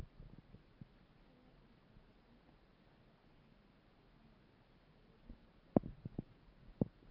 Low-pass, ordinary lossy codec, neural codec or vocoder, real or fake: 5.4 kHz; Opus, 32 kbps; none; real